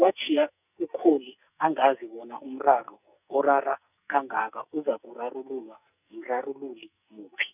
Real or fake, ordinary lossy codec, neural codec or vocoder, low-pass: fake; none; codec, 44.1 kHz, 2.6 kbps, SNAC; 3.6 kHz